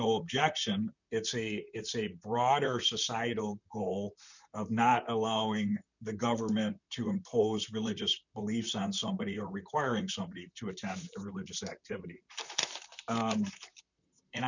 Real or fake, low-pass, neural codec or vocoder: fake; 7.2 kHz; vocoder, 44.1 kHz, 128 mel bands, Pupu-Vocoder